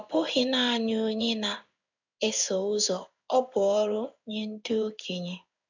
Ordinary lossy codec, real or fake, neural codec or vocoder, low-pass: none; fake; codec, 16 kHz in and 24 kHz out, 1 kbps, XY-Tokenizer; 7.2 kHz